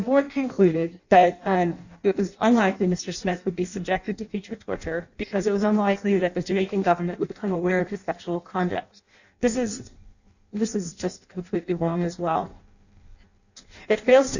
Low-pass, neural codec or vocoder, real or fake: 7.2 kHz; codec, 16 kHz in and 24 kHz out, 0.6 kbps, FireRedTTS-2 codec; fake